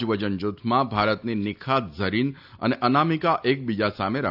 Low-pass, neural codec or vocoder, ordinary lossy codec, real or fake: 5.4 kHz; none; AAC, 48 kbps; real